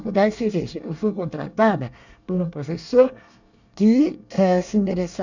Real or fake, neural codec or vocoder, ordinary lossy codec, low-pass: fake; codec, 24 kHz, 1 kbps, SNAC; none; 7.2 kHz